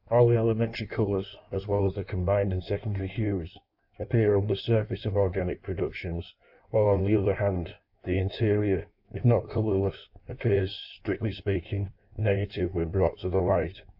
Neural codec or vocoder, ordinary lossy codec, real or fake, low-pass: codec, 16 kHz in and 24 kHz out, 1.1 kbps, FireRedTTS-2 codec; AAC, 48 kbps; fake; 5.4 kHz